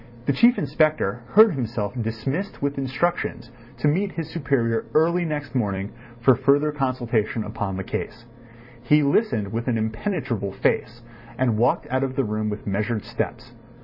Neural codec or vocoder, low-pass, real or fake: vocoder, 44.1 kHz, 128 mel bands every 256 samples, BigVGAN v2; 5.4 kHz; fake